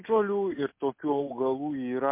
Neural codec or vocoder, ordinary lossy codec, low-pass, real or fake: none; MP3, 24 kbps; 3.6 kHz; real